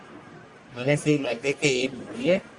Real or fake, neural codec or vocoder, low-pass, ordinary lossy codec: fake; codec, 44.1 kHz, 1.7 kbps, Pupu-Codec; 10.8 kHz; Opus, 64 kbps